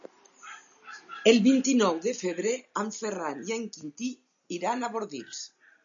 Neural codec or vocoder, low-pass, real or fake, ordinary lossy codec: none; 7.2 kHz; real; MP3, 64 kbps